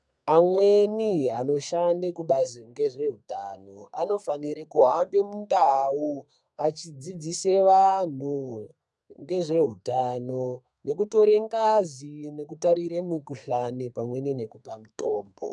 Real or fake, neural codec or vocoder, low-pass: fake; codec, 32 kHz, 1.9 kbps, SNAC; 10.8 kHz